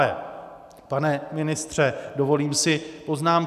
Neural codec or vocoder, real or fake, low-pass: none; real; 14.4 kHz